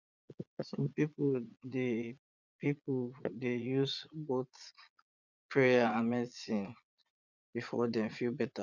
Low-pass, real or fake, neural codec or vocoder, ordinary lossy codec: 7.2 kHz; fake; vocoder, 22.05 kHz, 80 mel bands, Vocos; none